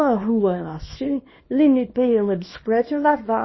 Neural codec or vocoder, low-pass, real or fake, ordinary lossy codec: codec, 24 kHz, 0.9 kbps, WavTokenizer, small release; 7.2 kHz; fake; MP3, 24 kbps